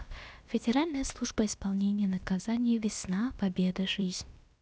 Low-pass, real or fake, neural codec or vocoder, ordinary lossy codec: none; fake; codec, 16 kHz, about 1 kbps, DyCAST, with the encoder's durations; none